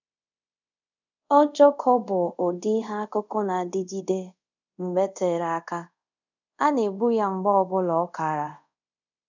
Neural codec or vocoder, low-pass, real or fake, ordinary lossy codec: codec, 24 kHz, 0.5 kbps, DualCodec; 7.2 kHz; fake; none